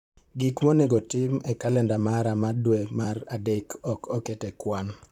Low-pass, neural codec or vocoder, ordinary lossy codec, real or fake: 19.8 kHz; vocoder, 44.1 kHz, 128 mel bands, Pupu-Vocoder; none; fake